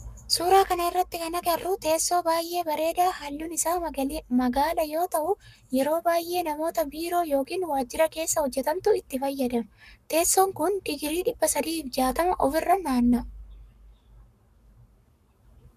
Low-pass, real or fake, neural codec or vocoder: 14.4 kHz; fake; codec, 44.1 kHz, 7.8 kbps, Pupu-Codec